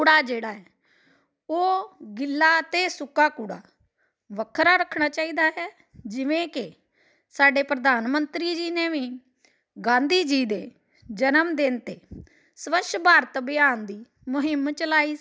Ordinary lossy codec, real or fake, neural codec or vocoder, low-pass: none; real; none; none